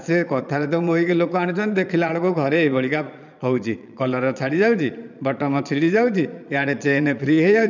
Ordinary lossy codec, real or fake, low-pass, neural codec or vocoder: none; fake; 7.2 kHz; vocoder, 22.05 kHz, 80 mel bands, Vocos